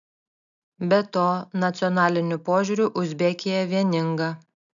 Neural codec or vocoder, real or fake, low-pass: none; real; 7.2 kHz